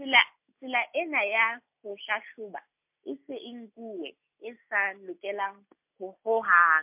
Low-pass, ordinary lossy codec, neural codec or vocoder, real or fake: 3.6 kHz; MP3, 32 kbps; none; real